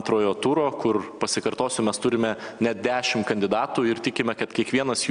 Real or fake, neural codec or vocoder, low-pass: real; none; 9.9 kHz